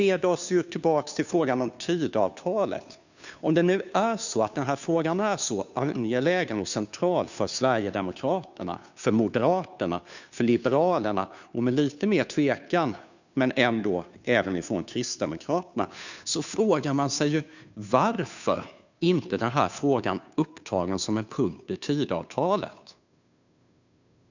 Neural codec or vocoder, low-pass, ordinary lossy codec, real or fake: codec, 16 kHz, 2 kbps, FunCodec, trained on Chinese and English, 25 frames a second; 7.2 kHz; none; fake